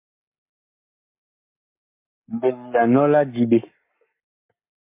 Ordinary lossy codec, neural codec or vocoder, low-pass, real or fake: MP3, 24 kbps; codec, 44.1 kHz, 7.8 kbps, Pupu-Codec; 3.6 kHz; fake